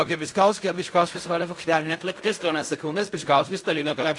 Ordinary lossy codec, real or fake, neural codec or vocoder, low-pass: AAC, 48 kbps; fake; codec, 16 kHz in and 24 kHz out, 0.4 kbps, LongCat-Audio-Codec, fine tuned four codebook decoder; 10.8 kHz